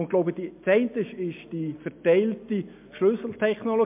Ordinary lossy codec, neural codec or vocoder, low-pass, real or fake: MP3, 32 kbps; none; 3.6 kHz; real